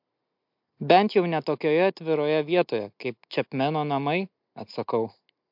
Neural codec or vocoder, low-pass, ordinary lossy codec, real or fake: none; 5.4 kHz; MP3, 48 kbps; real